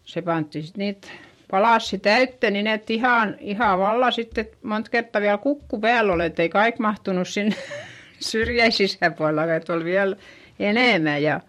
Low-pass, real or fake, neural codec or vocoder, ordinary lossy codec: 19.8 kHz; fake; vocoder, 44.1 kHz, 128 mel bands every 512 samples, BigVGAN v2; MP3, 64 kbps